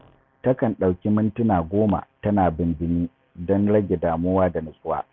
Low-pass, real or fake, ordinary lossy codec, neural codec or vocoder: none; real; none; none